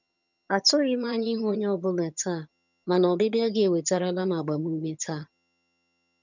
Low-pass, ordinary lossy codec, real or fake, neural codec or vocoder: 7.2 kHz; none; fake; vocoder, 22.05 kHz, 80 mel bands, HiFi-GAN